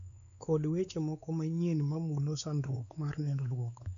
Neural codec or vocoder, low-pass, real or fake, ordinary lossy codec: codec, 16 kHz, 4 kbps, X-Codec, WavLM features, trained on Multilingual LibriSpeech; 7.2 kHz; fake; none